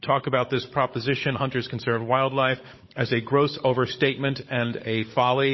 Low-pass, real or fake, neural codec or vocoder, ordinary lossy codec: 7.2 kHz; fake; codec, 16 kHz, 16 kbps, FunCodec, trained on Chinese and English, 50 frames a second; MP3, 24 kbps